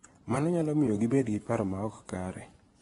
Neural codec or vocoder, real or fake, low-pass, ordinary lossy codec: vocoder, 24 kHz, 100 mel bands, Vocos; fake; 10.8 kHz; AAC, 32 kbps